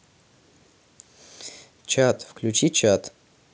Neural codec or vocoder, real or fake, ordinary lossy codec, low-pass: none; real; none; none